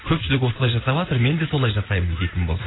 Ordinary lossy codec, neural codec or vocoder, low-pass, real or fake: AAC, 16 kbps; none; 7.2 kHz; real